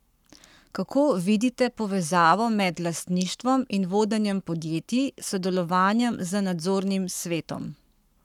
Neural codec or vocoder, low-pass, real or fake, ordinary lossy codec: codec, 44.1 kHz, 7.8 kbps, Pupu-Codec; 19.8 kHz; fake; none